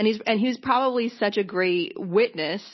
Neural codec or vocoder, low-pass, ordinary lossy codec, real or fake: none; 7.2 kHz; MP3, 24 kbps; real